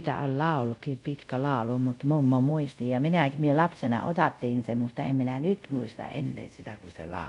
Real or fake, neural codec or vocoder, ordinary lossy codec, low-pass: fake; codec, 24 kHz, 0.5 kbps, DualCodec; none; 10.8 kHz